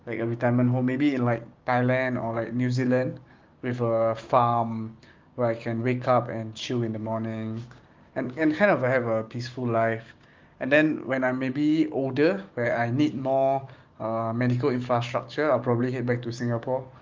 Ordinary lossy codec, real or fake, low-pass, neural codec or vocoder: Opus, 32 kbps; fake; 7.2 kHz; codec, 16 kHz, 6 kbps, DAC